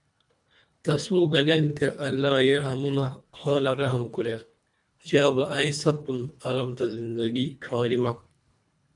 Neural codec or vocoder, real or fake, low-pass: codec, 24 kHz, 1.5 kbps, HILCodec; fake; 10.8 kHz